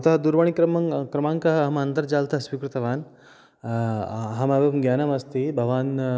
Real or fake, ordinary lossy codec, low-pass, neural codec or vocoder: real; none; none; none